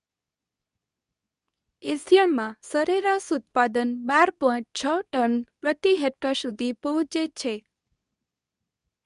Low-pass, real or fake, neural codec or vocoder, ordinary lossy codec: 10.8 kHz; fake; codec, 24 kHz, 0.9 kbps, WavTokenizer, medium speech release version 2; none